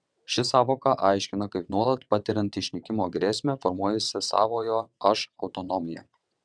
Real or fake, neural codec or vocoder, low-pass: fake; vocoder, 22.05 kHz, 80 mel bands, WaveNeXt; 9.9 kHz